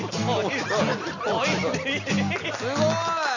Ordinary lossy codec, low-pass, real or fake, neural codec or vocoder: none; 7.2 kHz; real; none